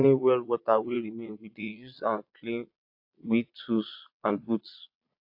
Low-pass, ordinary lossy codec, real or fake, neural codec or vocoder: 5.4 kHz; AAC, 48 kbps; fake; vocoder, 22.05 kHz, 80 mel bands, Vocos